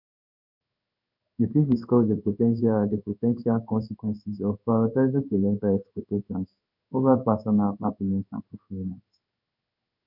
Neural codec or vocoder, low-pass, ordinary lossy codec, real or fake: codec, 16 kHz in and 24 kHz out, 1 kbps, XY-Tokenizer; 5.4 kHz; none; fake